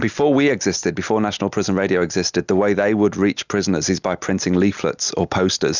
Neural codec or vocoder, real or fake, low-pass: none; real; 7.2 kHz